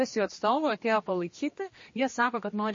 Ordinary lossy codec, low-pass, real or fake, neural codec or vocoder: MP3, 32 kbps; 7.2 kHz; fake; codec, 16 kHz, 2 kbps, X-Codec, HuBERT features, trained on general audio